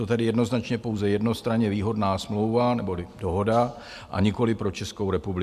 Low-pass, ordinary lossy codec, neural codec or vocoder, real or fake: 14.4 kHz; MP3, 96 kbps; none; real